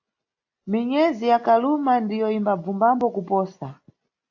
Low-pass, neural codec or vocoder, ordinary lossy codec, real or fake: 7.2 kHz; none; AAC, 48 kbps; real